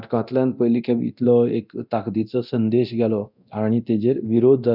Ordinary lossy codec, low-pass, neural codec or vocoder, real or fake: none; 5.4 kHz; codec, 24 kHz, 0.9 kbps, DualCodec; fake